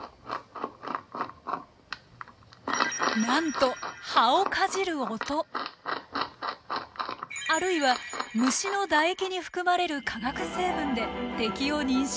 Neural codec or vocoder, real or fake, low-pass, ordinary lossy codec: none; real; none; none